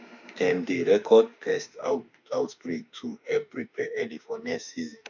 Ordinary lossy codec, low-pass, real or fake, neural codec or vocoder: none; 7.2 kHz; fake; autoencoder, 48 kHz, 32 numbers a frame, DAC-VAE, trained on Japanese speech